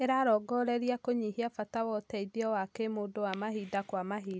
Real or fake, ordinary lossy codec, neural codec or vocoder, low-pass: real; none; none; none